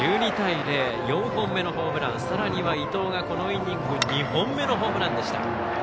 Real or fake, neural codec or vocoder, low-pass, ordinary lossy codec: real; none; none; none